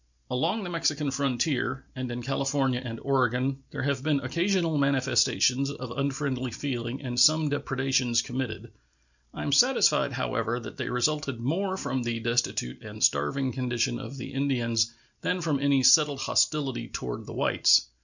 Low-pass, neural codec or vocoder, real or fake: 7.2 kHz; none; real